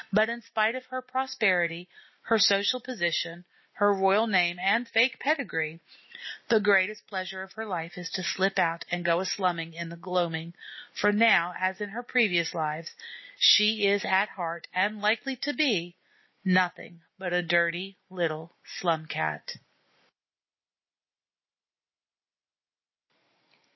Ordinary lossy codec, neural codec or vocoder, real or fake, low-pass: MP3, 24 kbps; none; real; 7.2 kHz